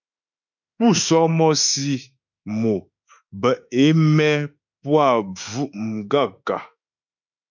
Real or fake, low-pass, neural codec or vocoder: fake; 7.2 kHz; autoencoder, 48 kHz, 32 numbers a frame, DAC-VAE, trained on Japanese speech